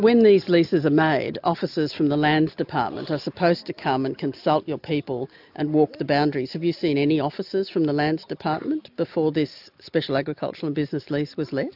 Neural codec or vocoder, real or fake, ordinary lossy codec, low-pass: none; real; AAC, 48 kbps; 5.4 kHz